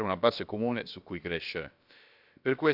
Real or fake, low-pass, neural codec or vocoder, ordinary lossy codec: fake; 5.4 kHz; codec, 16 kHz, about 1 kbps, DyCAST, with the encoder's durations; none